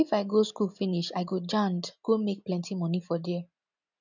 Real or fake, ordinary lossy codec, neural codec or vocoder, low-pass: real; none; none; 7.2 kHz